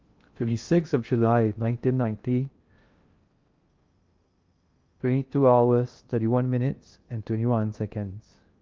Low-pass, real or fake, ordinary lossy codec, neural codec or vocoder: 7.2 kHz; fake; Opus, 32 kbps; codec, 16 kHz in and 24 kHz out, 0.6 kbps, FocalCodec, streaming, 2048 codes